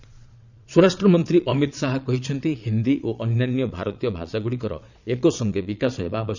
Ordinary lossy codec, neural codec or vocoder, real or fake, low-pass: none; vocoder, 22.05 kHz, 80 mel bands, Vocos; fake; 7.2 kHz